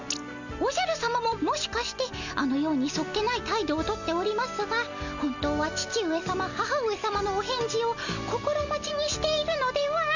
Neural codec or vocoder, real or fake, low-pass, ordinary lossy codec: none; real; 7.2 kHz; none